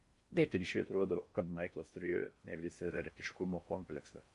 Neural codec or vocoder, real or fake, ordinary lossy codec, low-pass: codec, 16 kHz in and 24 kHz out, 0.6 kbps, FocalCodec, streaming, 4096 codes; fake; MP3, 48 kbps; 10.8 kHz